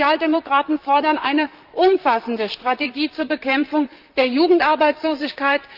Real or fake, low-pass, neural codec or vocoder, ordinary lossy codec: fake; 5.4 kHz; vocoder, 44.1 kHz, 128 mel bands, Pupu-Vocoder; Opus, 24 kbps